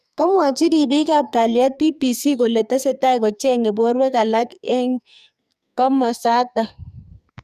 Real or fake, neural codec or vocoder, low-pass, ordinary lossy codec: fake; codec, 44.1 kHz, 2.6 kbps, SNAC; 14.4 kHz; none